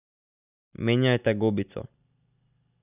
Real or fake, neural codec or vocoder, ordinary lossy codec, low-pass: real; none; none; 3.6 kHz